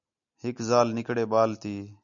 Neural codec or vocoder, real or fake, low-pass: none; real; 7.2 kHz